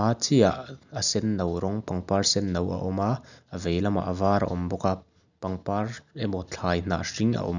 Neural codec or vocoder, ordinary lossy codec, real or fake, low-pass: none; none; real; 7.2 kHz